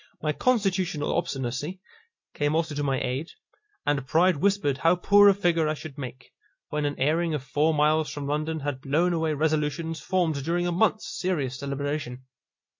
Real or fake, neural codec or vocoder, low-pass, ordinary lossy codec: real; none; 7.2 kHz; MP3, 48 kbps